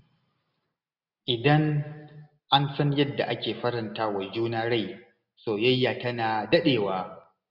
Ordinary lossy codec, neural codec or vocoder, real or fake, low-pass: none; none; real; 5.4 kHz